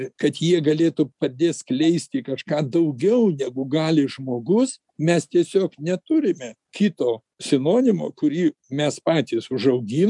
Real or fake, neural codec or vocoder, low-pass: real; none; 10.8 kHz